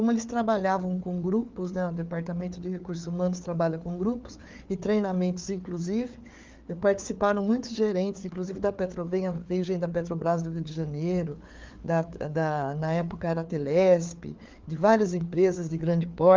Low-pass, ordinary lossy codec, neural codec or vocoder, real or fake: 7.2 kHz; Opus, 32 kbps; codec, 16 kHz, 4 kbps, FreqCodec, larger model; fake